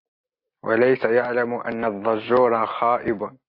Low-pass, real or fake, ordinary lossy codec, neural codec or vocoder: 5.4 kHz; real; AAC, 32 kbps; none